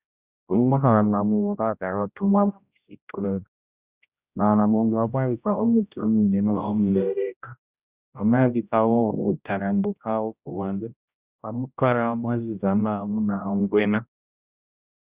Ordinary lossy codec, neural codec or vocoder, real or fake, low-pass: Opus, 64 kbps; codec, 16 kHz, 0.5 kbps, X-Codec, HuBERT features, trained on general audio; fake; 3.6 kHz